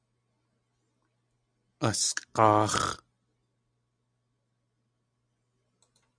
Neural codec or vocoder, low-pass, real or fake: none; 9.9 kHz; real